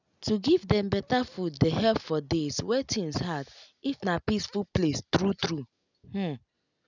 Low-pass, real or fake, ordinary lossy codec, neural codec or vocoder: 7.2 kHz; real; none; none